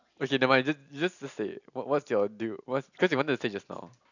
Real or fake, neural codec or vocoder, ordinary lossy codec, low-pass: real; none; none; 7.2 kHz